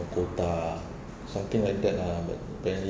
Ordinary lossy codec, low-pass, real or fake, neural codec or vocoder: none; none; real; none